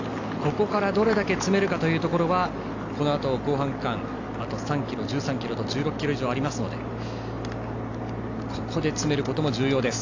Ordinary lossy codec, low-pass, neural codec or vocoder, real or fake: AAC, 48 kbps; 7.2 kHz; none; real